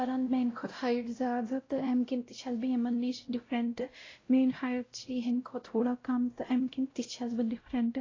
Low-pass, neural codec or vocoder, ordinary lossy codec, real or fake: 7.2 kHz; codec, 16 kHz, 0.5 kbps, X-Codec, WavLM features, trained on Multilingual LibriSpeech; AAC, 32 kbps; fake